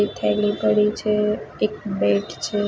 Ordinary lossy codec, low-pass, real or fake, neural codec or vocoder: none; none; real; none